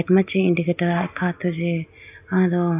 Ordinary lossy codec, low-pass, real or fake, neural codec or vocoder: AAC, 32 kbps; 3.6 kHz; real; none